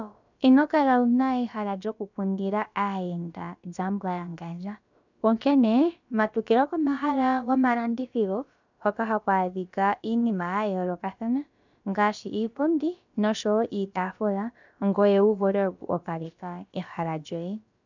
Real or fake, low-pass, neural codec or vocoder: fake; 7.2 kHz; codec, 16 kHz, about 1 kbps, DyCAST, with the encoder's durations